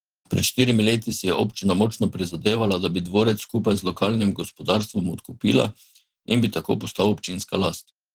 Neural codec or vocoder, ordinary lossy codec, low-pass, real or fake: vocoder, 44.1 kHz, 128 mel bands every 512 samples, BigVGAN v2; Opus, 16 kbps; 19.8 kHz; fake